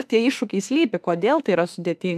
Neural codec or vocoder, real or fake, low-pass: autoencoder, 48 kHz, 32 numbers a frame, DAC-VAE, trained on Japanese speech; fake; 14.4 kHz